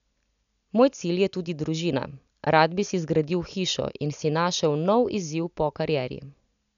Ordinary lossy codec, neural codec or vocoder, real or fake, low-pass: none; none; real; 7.2 kHz